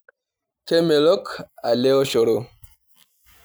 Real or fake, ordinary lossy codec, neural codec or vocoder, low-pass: real; none; none; none